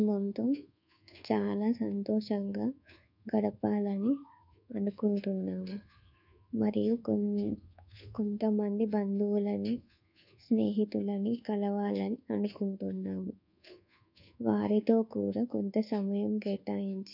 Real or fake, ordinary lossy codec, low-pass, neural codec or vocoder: fake; none; 5.4 kHz; codec, 24 kHz, 1.2 kbps, DualCodec